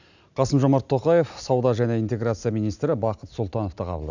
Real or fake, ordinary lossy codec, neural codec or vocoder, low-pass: real; none; none; 7.2 kHz